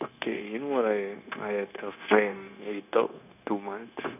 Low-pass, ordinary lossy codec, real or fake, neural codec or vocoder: 3.6 kHz; none; fake; codec, 16 kHz, 0.9 kbps, LongCat-Audio-Codec